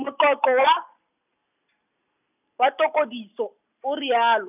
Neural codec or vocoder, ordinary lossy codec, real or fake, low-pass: none; none; real; 3.6 kHz